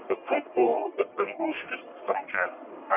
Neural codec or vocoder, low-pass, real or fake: codec, 44.1 kHz, 1.7 kbps, Pupu-Codec; 3.6 kHz; fake